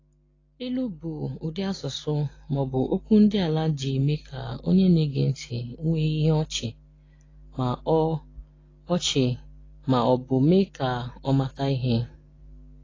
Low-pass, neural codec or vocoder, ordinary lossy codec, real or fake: 7.2 kHz; none; AAC, 32 kbps; real